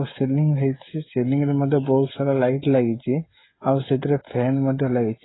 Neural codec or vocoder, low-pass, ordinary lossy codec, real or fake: codec, 16 kHz, 16 kbps, FreqCodec, smaller model; 7.2 kHz; AAC, 16 kbps; fake